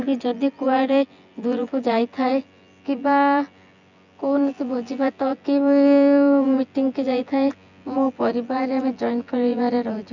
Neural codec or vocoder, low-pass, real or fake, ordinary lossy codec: vocoder, 24 kHz, 100 mel bands, Vocos; 7.2 kHz; fake; none